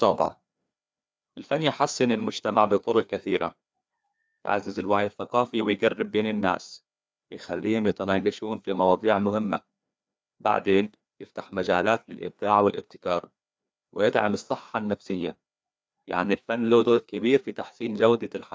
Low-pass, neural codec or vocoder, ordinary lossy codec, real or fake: none; codec, 16 kHz, 2 kbps, FreqCodec, larger model; none; fake